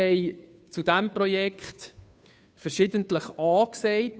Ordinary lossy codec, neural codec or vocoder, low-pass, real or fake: none; codec, 16 kHz, 2 kbps, FunCodec, trained on Chinese and English, 25 frames a second; none; fake